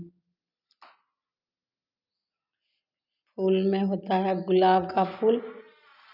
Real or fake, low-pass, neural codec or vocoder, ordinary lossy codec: real; 5.4 kHz; none; none